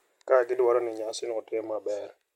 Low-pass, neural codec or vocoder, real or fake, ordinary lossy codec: 19.8 kHz; none; real; MP3, 64 kbps